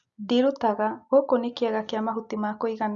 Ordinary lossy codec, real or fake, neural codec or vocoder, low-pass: Opus, 64 kbps; real; none; 7.2 kHz